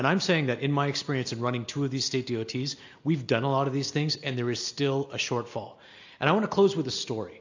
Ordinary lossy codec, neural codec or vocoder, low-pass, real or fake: AAC, 48 kbps; none; 7.2 kHz; real